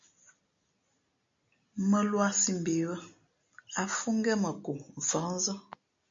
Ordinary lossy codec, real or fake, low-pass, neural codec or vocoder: MP3, 48 kbps; real; 7.2 kHz; none